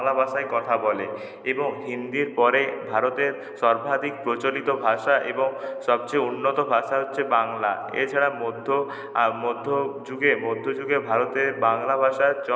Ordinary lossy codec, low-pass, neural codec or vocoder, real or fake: none; none; none; real